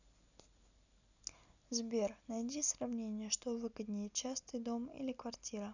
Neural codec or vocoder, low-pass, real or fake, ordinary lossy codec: none; 7.2 kHz; real; none